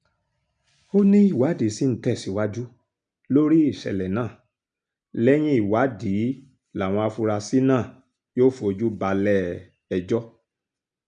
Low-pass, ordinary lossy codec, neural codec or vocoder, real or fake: 9.9 kHz; none; none; real